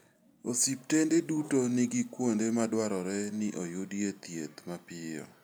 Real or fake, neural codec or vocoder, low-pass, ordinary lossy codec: real; none; none; none